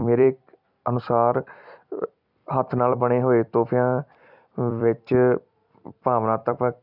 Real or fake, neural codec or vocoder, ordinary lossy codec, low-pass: fake; vocoder, 44.1 kHz, 128 mel bands every 256 samples, BigVGAN v2; none; 5.4 kHz